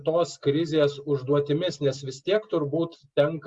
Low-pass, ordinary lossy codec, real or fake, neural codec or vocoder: 10.8 kHz; Opus, 64 kbps; real; none